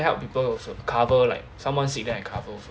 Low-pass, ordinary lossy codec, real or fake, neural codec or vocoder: none; none; real; none